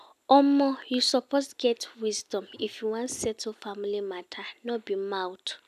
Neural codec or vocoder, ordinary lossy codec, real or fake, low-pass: none; none; real; 14.4 kHz